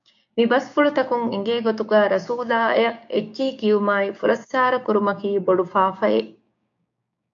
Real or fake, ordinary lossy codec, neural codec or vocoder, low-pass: fake; AAC, 64 kbps; codec, 16 kHz, 6 kbps, DAC; 7.2 kHz